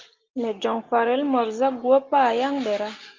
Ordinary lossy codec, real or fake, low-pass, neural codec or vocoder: Opus, 24 kbps; real; 7.2 kHz; none